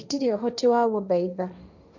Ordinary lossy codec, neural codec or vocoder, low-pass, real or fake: none; codec, 16 kHz, 1.1 kbps, Voila-Tokenizer; 7.2 kHz; fake